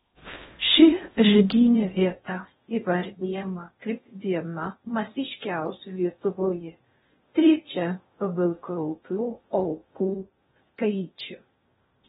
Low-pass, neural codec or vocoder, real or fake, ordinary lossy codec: 10.8 kHz; codec, 16 kHz in and 24 kHz out, 0.6 kbps, FocalCodec, streaming, 4096 codes; fake; AAC, 16 kbps